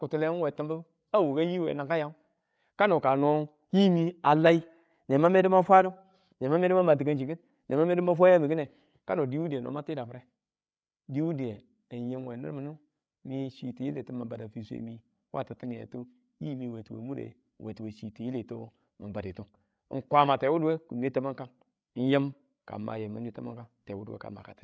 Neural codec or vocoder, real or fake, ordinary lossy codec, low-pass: codec, 16 kHz, 8 kbps, FreqCodec, larger model; fake; none; none